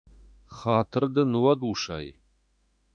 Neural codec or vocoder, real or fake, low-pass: autoencoder, 48 kHz, 32 numbers a frame, DAC-VAE, trained on Japanese speech; fake; 9.9 kHz